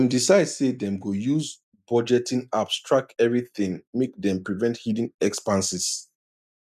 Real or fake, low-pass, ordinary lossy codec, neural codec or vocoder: real; 14.4 kHz; none; none